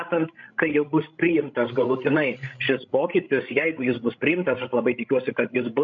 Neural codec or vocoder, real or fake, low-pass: codec, 16 kHz, 16 kbps, FreqCodec, larger model; fake; 7.2 kHz